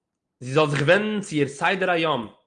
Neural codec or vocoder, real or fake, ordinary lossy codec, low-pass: none; real; Opus, 32 kbps; 9.9 kHz